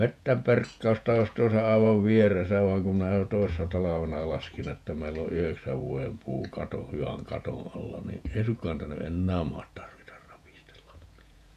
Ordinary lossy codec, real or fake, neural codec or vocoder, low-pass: none; fake; vocoder, 48 kHz, 128 mel bands, Vocos; 14.4 kHz